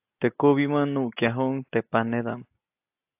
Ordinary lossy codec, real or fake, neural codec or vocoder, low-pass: AAC, 32 kbps; real; none; 3.6 kHz